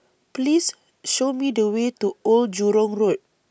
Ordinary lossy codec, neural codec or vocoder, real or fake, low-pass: none; none; real; none